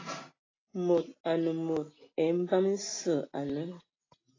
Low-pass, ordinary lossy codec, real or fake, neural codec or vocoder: 7.2 kHz; AAC, 32 kbps; real; none